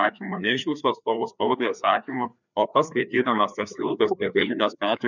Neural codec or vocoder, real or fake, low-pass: codec, 16 kHz, 2 kbps, FreqCodec, larger model; fake; 7.2 kHz